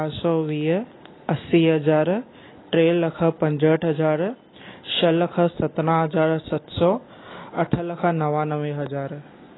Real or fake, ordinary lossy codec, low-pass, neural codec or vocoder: real; AAC, 16 kbps; 7.2 kHz; none